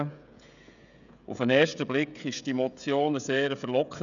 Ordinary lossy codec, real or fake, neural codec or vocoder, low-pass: none; fake; codec, 16 kHz, 16 kbps, FreqCodec, smaller model; 7.2 kHz